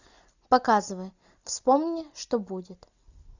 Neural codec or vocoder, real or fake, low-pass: none; real; 7.2 kHz